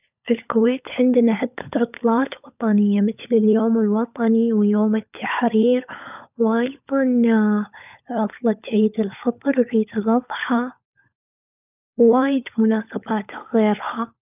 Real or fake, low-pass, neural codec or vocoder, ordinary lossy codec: fake; 3.6 kHz; codec, 16 kHz, 16 kbps, FunCodec, trained on LibriTTS, 50 frames a second; none